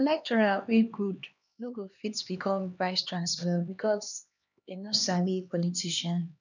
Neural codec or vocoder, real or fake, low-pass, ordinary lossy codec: codec, 16 kHz, 2 kbps, X-Codec, HuBERT features, trained on LibriSpeech; fake; 7.2 kHz; none